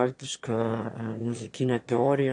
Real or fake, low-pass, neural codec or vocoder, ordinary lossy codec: fake; 9.9 kHz; autoencoder, 22.05 kHz, a latent of 192 numbers a frame, VITS, trained on one speaker; AAC, 48 kbps